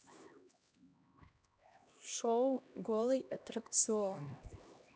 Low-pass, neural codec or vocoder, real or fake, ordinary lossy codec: none; codec, 16 kHz, 1 kbps, X-Codec, HuBERT features, trained on LibriSpeech; fake; none